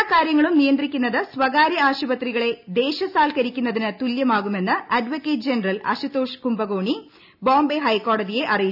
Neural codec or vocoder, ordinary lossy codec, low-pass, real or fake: none; none; 5.4 kHz; real